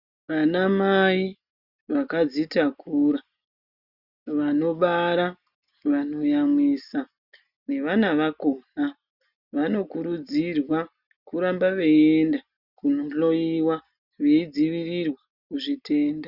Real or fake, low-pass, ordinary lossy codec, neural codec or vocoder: real; 5.4 kHz; Opus, 64 kbps; none